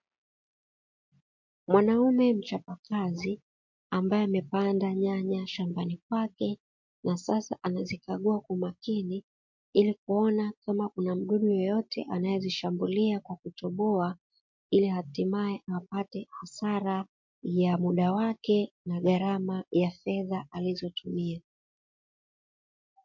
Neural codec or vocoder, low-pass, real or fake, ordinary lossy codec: none; 7.2 kHz; real; MP3, 48 kbps